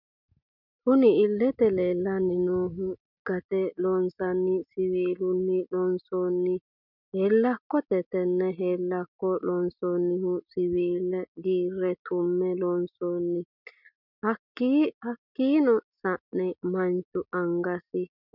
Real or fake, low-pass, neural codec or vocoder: real; 5.4 kHz; none